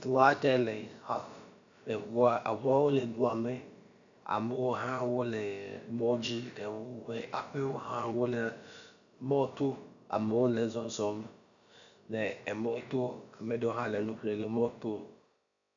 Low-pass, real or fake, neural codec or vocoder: 7.2 kHz; fake; codec, 16 kHz, about 1 kbps, DyCAST, with the encoder's durations